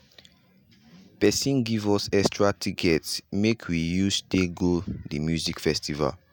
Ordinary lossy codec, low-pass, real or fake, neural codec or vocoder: none; none; real; none